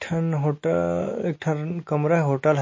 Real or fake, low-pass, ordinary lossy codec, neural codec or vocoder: real; 7.2 kHz; MP3, 32 kbps; none